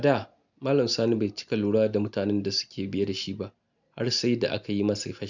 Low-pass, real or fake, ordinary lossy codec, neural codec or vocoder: 7.2 kHz; real; none; none